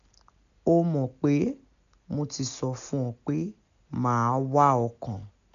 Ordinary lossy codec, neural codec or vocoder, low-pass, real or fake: none; none; 7.2 kHz; real